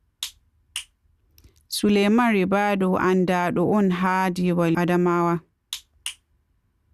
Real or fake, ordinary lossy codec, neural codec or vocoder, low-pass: real; Opus, 64 kbps; none; 14.4 kHz